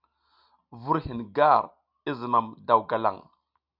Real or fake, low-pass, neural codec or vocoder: real; 5.4 kHz; none